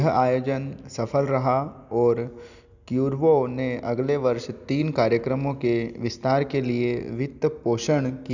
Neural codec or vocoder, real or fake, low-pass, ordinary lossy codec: none; real; 7.2 kHz; none